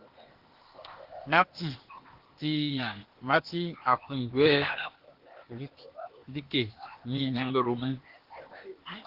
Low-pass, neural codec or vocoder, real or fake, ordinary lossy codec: 5.4 kHz; codec, 16 kHz, 0.8 kbps, ZipCodec; fake; Opus, 16 kbps